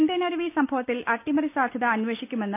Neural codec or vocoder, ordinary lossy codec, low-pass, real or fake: none; none; 3.6 kHz; real